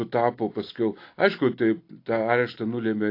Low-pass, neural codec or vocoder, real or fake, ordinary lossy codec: 5.4 kHz; none; real; AAC, 32 kbps